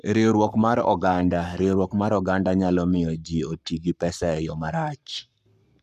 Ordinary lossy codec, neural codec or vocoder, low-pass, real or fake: none; codec, 44.1 kHz, 7.8 kbps, Pupu-Codec; 14.4 kHz; fake